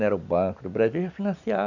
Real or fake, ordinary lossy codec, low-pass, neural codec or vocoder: real; none; 7.2 kHz; none